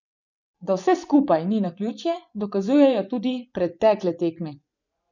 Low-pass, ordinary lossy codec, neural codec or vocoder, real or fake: 7.2 kHz; none; vocoder, 24 kHz, 100 mel bands, Vocos; fake